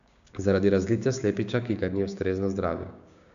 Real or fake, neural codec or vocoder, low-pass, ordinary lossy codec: fake; codec, 16 kHz, 6 kbps, DAC; 7.2 kHz; none